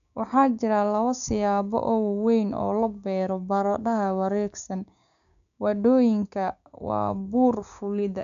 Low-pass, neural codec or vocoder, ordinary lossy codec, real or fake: 7.2 kHz; codec, 16 kHz, 6 kbps, DAC; none; fake